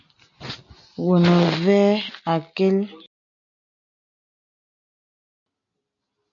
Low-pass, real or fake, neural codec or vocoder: 7.2 kHz; real; none